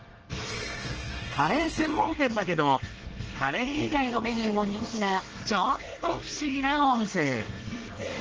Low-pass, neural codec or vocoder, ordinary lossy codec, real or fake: 7.2 kHz; codec, 24 kHz, 1 kbps, SNAC; Opus, 16 kbps; fake